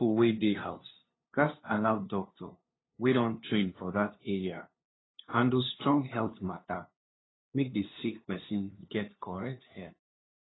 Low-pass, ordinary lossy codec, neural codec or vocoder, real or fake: 7.2 kHz; AAC, 16 kbps; codec, 16 kHz, 2 kbps, FunCodec, trained on LibriTTS, 25 frames a second; fake